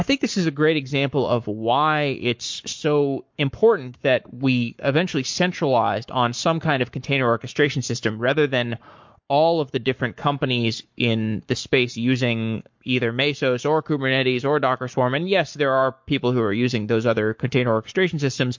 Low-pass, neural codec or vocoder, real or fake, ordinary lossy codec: 7.2 kHz; codec, 44.1 kHz, 7.8 kbps, Pupu-Codec; fake; MP3, 48 kbps